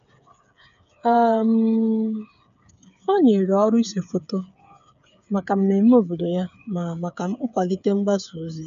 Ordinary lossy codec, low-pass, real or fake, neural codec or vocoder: AAC, 96 kbps; 7.2 kHz; fake; codec, 16 kHz, 8 kbps, FreqCodec, smaller model